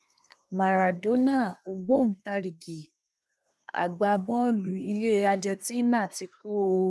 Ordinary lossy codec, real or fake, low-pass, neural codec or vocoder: none; fake; none; codec, 24 kHz, 1 kbps, SNAC